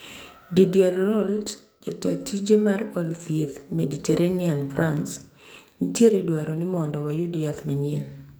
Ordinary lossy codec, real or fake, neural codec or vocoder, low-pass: none; fake; codec, 44.1 kHz, 2.6 kbps, SNAC; none